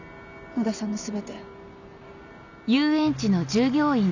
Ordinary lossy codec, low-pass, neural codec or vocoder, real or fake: none; 7.2 kHz; none; real